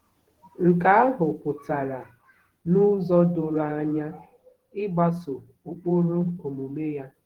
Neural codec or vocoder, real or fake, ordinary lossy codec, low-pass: vocoder, 48 kHz, 128 mel bands, Vocos; fake; Opus, 16 kbps; 19.8 kHz